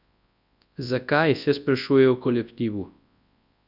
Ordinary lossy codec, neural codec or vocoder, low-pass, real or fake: none; codec, 24 kHz, 0.9 kbps, WavTokenizer, large speech release; 5.4 kHz; fake